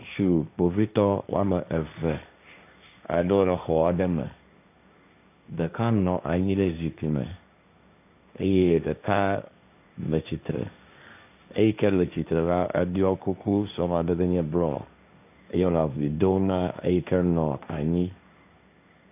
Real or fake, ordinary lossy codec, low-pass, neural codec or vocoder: fake; AAC, 32 kbps; 3.6 kHz; codec, 16 kHz, 1.1 kbps, Voila-Tokenizer